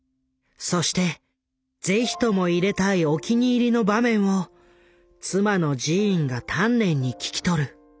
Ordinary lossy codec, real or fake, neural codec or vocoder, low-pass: none; real; none; none